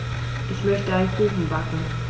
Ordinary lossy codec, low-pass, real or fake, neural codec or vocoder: none; none; real; none